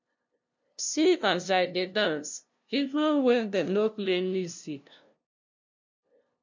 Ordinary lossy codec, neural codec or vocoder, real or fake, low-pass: MP3, 64 kbps; codec, 16 kHz, 0.5 kbps, FunCodec, trained on LibriTTS, 25 frames a second; fake; 7.2 kHz